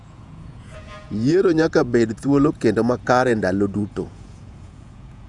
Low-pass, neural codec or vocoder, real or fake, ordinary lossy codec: 10.8 kHz; vocoder, 48 kHz, 128 mel bands, Vocos; fake; none